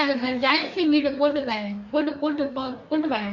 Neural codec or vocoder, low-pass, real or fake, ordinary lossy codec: codec, 24 kHz, 1 kbps, SNAC; 7.2 kHz; fake; none